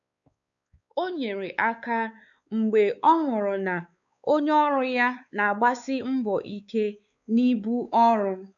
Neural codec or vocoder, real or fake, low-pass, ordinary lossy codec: codec, 16 kHz, 4 kbps, X-Codec, WavLM features, trained on Multilingual LibriSpeech; fake; 7.2 kHz; none